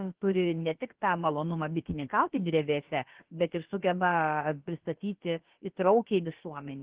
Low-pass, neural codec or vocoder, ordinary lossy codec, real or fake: 3.6 kHz; codec, 16 kHz, about 1 kbps, DyCAST, with the encoder's durations; Opus, 16 kbps; fake